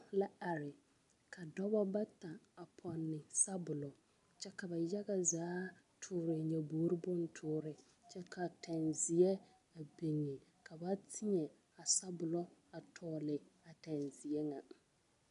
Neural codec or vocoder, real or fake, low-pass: none; real; 10.8 kHz